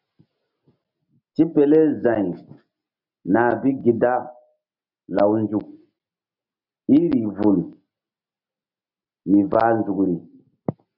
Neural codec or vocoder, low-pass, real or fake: none; 5.4 kHz; real